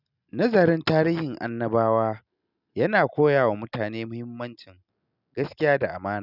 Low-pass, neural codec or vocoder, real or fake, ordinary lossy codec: 5.4 kHz; none; real; none